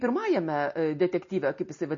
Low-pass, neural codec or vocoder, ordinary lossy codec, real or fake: 7.2 kHz; none; MP3, 32 kbps; real